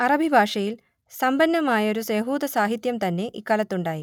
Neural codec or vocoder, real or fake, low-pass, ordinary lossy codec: none; real; 19.8 kHz; none